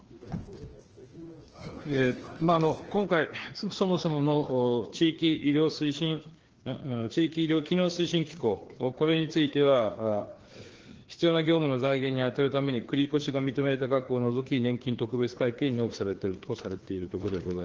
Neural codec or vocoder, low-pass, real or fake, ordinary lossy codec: codec, 16 kHz, 2 kbps, FreqCodec, larger model; 7.2 kHz; fake; Opus, 16 kbps